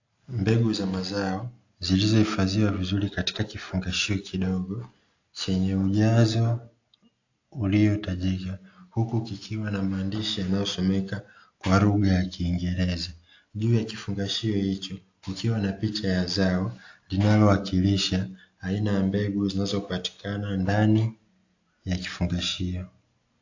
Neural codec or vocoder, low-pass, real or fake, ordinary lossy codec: none; 7.2 kHz; real; AAC, 48 kbps